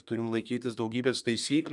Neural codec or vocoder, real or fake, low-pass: codec, 24 kHz, 1 kbps, SNAC; fake; 10.8 kHz